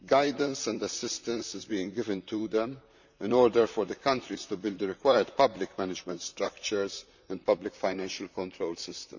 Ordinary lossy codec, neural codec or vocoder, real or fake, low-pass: none; vocoder, 44.1 kHz, 128 mel bands, Pupu-Vocoder; fake; 7.2 kHz